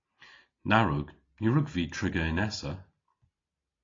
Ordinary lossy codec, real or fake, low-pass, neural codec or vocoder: AAC, 32 kbps; real; 7.2 kHz; none